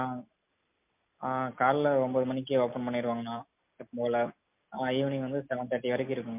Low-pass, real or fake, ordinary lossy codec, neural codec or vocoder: 3.6 kHz; real; MP3, 32 kbps; none